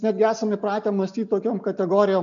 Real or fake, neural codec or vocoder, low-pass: real; none; 7.2 kHz